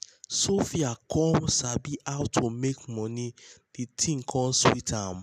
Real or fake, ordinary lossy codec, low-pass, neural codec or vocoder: real; none; 14.4 kHz; none